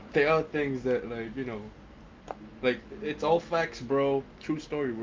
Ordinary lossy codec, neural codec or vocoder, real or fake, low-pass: Opus, 24 kbps; none; real; 7.2 kHz